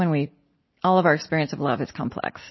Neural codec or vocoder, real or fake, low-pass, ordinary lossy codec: none; real; 7.2 kHz; MP3, 24 kbps